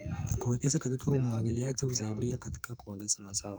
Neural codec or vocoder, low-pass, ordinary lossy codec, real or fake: codec, 44.1 kHz, 2.6 kbps, SNAC; none; none; fake